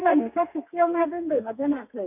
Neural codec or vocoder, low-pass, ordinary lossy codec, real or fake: codec, 32 kHz, 1.9 kbps, SNAC; 3.6 kHz; none; fake